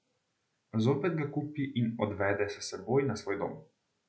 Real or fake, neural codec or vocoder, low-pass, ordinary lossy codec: real; none; none; none